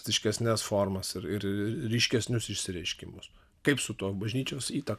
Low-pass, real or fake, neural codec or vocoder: 14.4 kHz; real; none